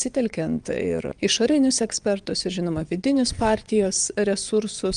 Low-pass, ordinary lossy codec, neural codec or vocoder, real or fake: 9.9 kHz; Opus, 32 kbps; vocoder, 22.05 kHz, 80 mel bands, WaveNeXt; fake